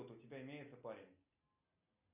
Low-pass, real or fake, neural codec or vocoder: 3.6 kHz; real; none